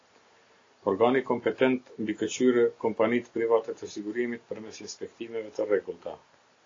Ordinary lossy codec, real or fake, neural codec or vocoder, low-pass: AAC, 32 kbps; real; none; 7.2 kHz